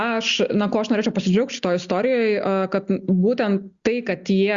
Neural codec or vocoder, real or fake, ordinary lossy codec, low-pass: none; real; Opus, 64 kbps; 7.2 kHz